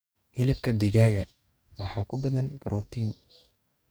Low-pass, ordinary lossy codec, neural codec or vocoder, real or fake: none; none; codec, 44.1 kHz, 2.6 kbps, DAC; fake